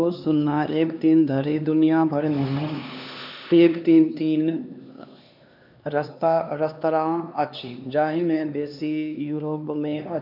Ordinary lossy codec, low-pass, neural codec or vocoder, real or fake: none; 5.4 kHz; codec, 16 kHz, 2 kbps, X-Codec, HuBERT features, trained on LibriSpeech; fake